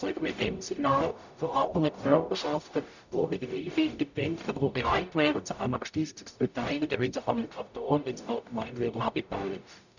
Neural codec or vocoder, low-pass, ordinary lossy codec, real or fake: codec, 44.1 kHz, 0.9 kbps, DAC; 7.2 kHz; none; fake